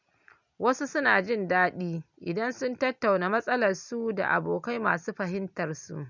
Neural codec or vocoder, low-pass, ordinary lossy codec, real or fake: none; 7.2 kHz; none; real